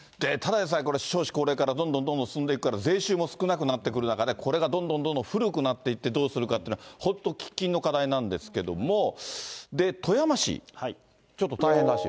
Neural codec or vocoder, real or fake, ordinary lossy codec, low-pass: none; real; none; none